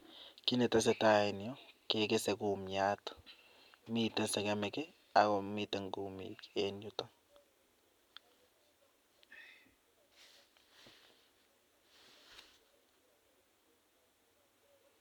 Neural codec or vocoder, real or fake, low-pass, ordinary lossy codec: none; real; 19.8 kHz; MP3, 96 kbps